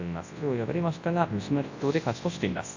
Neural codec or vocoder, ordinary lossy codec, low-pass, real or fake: codec, 24 kHz, 0.9 kbps, WavTokenizer, large speech release; AAC, 48 kbps; 7.2 kHz; fake